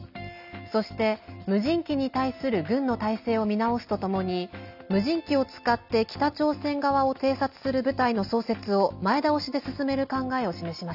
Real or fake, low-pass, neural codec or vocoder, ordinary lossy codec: real; 5.4 kHz; none; none